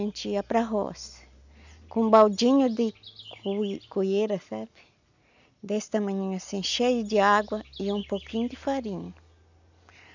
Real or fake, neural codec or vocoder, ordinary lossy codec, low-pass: real; none; none; 7.2 kHz